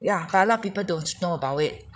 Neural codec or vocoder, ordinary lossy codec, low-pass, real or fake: codec, 16 kHz, 16 kbps, FreqCodec, larger model; none; none; fake